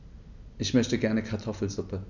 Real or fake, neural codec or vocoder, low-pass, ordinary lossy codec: real; none; 7.2 kHz; none